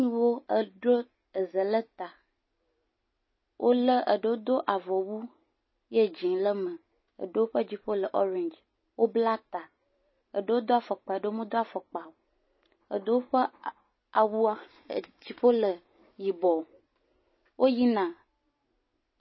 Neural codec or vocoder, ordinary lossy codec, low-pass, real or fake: none; MP3, 24 kbps; 7.2 kHz; real